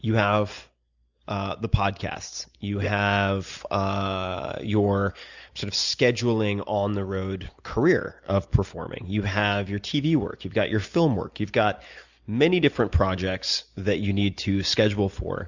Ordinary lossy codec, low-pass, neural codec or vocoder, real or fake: Opus, 64 kbps; 7.2 kHz; none; real